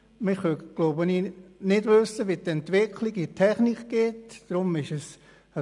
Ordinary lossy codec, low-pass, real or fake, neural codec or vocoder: none; 10.8 kHz; real; none